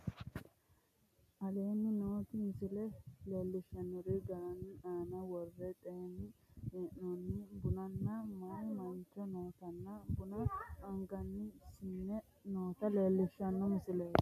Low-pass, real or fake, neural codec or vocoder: 14.4 kHz; real; none